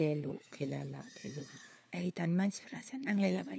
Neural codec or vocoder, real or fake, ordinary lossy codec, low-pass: codec, 16 kHz, 4 kbps, FunCodec, trained on LibriTTS, 50 frames a second; fake; none; none